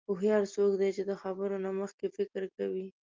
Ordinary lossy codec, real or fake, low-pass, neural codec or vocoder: Opus, 32 kbps; real; 7.2 kHz; none